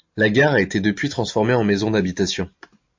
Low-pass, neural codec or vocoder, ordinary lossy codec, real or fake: 7.2 kHz; none; MP3, 48 kbps; real